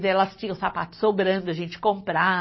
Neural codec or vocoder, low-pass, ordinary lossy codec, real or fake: none; 7.2 kHz; MP3, 24 kbps; real